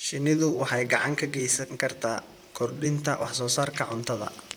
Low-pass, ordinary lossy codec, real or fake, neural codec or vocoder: none; none; fake; vocoder, 44.1 kHz, 128 mel bands, Pupu-Vocoder